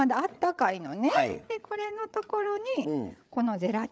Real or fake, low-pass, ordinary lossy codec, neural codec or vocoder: fake; none; none; codec, 16 kHz, 8 kbps, FreqCodec, larger model